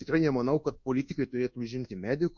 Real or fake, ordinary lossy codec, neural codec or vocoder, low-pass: fake; MP3, 48 kbps; codec, 24 kHz, 1.2 kbps, DualCodec; 7.2 kHz